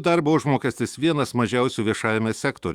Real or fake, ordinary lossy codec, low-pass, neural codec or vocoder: fake; Opus, 64 kbps; 19.8 kHz; autoencoder, 48 kHz, 128 numbers a frame, DAC-VAE, trained on Japanese speech